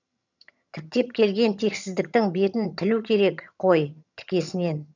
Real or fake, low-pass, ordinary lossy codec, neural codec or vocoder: fake; 7.2 kHz; none; vocoder, 22.05 kHz, 80 mel bands, HiFi-GAN